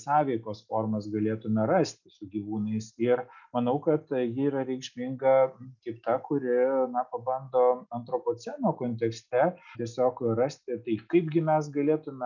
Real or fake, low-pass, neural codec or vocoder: real; 7.2 kHz; none